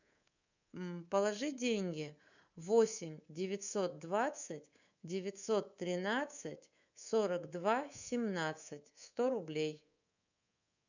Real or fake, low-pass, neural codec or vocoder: fake; 7.2 kHz; codec, 24 kHz, 3.1 kbps, DualCodec